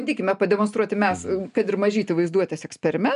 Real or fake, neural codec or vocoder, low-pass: real; none; 10.8 kHz